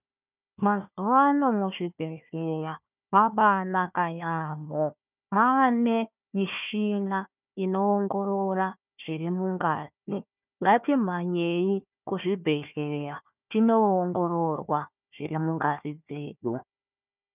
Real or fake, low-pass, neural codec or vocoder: fake; 3.6 kHz; codec, 16 kHz, 1 kbps, FunCodec, trained on Chinese and English, 50 frames a second